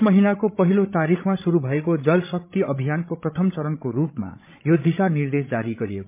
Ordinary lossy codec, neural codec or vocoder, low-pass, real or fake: MP3, 24 kbps; codec, 16 kHz, 16 kbps, FreqCodec, larger model; 3.6 kHz; fake